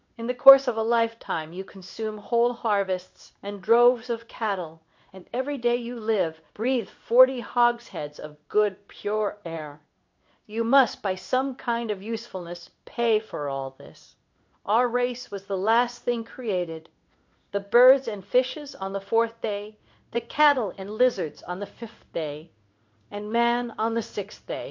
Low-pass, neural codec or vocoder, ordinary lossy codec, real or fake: 7.2 kHz; codec, 16 kHz in and 24 kHz out, 1 kbps, XY-Tokenizer; MP3, 48 kbps; fake